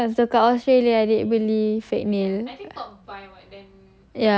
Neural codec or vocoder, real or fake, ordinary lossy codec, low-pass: none; real; none; none